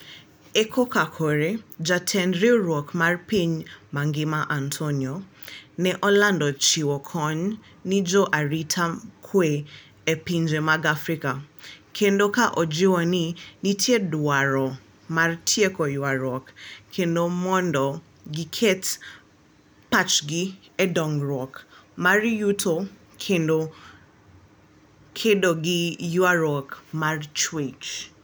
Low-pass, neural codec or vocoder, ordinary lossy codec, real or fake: none; none; none; real